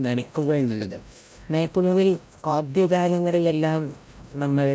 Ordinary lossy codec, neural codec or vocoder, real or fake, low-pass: none; codec, 16 kHz, 0.5 kbps, FreqCodec, larger model; fake; none